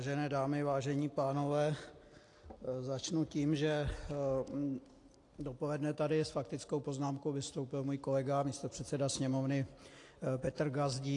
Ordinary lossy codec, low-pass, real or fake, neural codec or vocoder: AAC, 48 kbps; 10.8 kHz; real; none